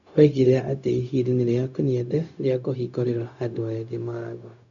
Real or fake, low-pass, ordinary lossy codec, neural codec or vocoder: fake; 7.2 kHz; none; codec, 16 kHz, 0.4 kbps, LongCat-Audio-Codec